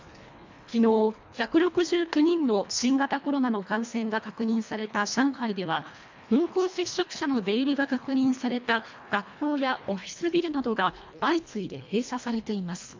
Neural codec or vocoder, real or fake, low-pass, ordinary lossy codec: codec, 24 kHz, 1.5 kbps, HILCodec; fake; 7.2 kHz; MP3, 64 kbps